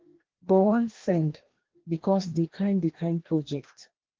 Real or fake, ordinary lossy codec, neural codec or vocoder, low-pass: fake; Opus, 16 kbps; codec, 16 kHz, 1 kbps, FreqCodec, larger model; 7.2 kHz